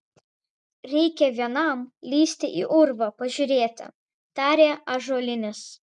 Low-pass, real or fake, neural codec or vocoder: 10.8 kHz; real; none